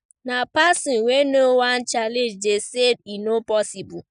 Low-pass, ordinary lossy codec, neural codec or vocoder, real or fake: 9.9 kHz; none; none; real